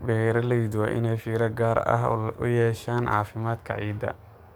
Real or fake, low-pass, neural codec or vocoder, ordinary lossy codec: fake; none; codec, 44.1 kHz, 7.8 kbps, DAC; none